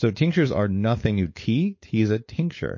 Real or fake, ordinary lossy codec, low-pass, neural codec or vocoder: fake; MP3, 32 kbps; 7.2 kHz; codec, 16 kHz, 4.8 kbps, FACodec